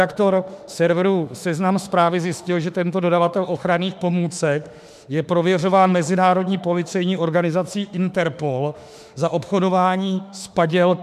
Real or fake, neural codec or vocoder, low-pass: fake; autoencoder, 48 kHz, 32 numbers a frame, DAC-VAE, trained on Japanese speech; 14.4 kHz